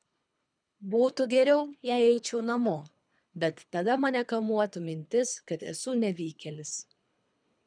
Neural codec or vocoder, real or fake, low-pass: codec, 24 kHz, 3 kbps, HILCodec; fake; 9.9 kHz